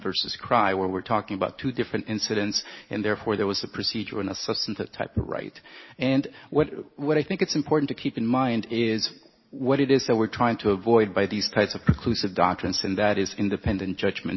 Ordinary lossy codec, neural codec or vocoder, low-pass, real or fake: MP3, 24 kbps; none; 7.2 kHz; real